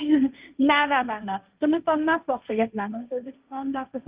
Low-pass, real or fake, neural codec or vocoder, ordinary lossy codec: 3.6 kHz; fake; codec, 16 kHz, 1.1 kbps, Voila-Tokenizer; Opus, 24 kbps